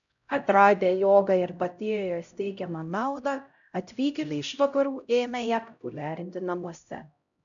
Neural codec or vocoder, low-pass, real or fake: codec, 16 kHz, 0.5 kbps, X-Codec, HuBERT features, trained on LibriSpeech; 7.2 kHz; fake